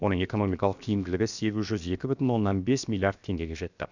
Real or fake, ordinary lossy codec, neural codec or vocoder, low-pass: fake; none; codec, 16 kHz, 0.7 kbps, FocalCodec; 7.2 kHz